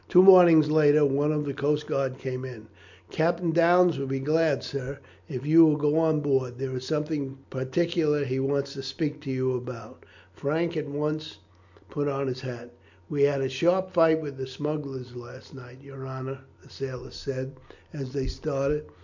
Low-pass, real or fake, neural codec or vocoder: 7.2 kHz; real; none